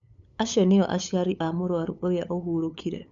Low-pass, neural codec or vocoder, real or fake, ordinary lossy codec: 7.2 kHz; codec, 16 kHz, 8 kbps, FunCodec, trained on LibriTTS, 25 frames a second; fake; none